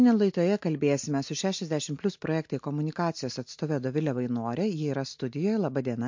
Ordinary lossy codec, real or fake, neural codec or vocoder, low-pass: MP3, 48 kbps; real; none; 7.2 kHz